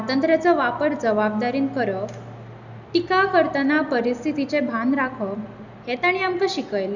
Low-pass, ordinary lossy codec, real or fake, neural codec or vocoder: 7.2 kHz; none; real; none